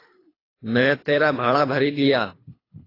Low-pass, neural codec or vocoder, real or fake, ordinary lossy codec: 5.4 kHz; codec, 24 kHz, 3 kbps, HILCodec; fake; AAC, 24 kbps